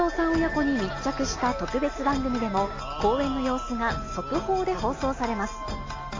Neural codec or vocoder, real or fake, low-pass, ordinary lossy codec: none; real; 7.2 kHz; AAC, 32 kbps